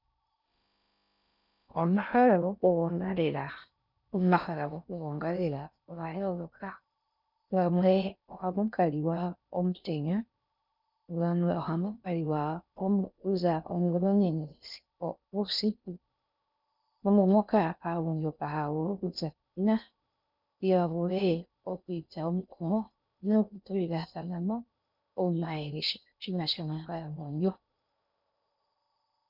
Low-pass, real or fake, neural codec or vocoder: 5.4 kHz; fake; codec, 16 kHz in and 24 kHz out, 0.6 kbps, FocalCodec, streaming, 4096 codes